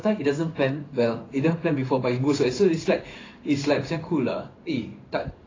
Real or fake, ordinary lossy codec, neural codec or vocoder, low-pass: fake; AAC, 32 kbps; codec, 16 kHz in and 24 kHz out, 1 kbps, XY-Tokenizer; 7.2 kHz